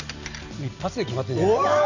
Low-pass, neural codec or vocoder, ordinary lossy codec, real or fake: 7.2 kHz; none; Opus, 64 kbps; real